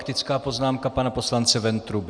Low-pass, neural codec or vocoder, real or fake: 9.9 kHz; none; real